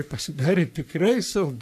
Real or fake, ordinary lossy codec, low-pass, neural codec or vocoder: fake; AAC, 96 kbps; 14.4 kHz; codec, 44.1 kHz, 3.4 kbps, Pupu-Codec